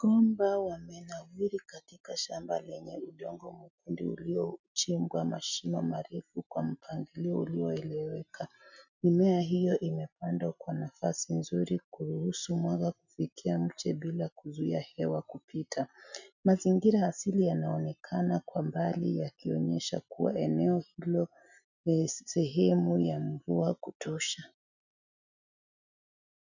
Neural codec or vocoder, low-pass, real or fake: none; 7.2 kHz; real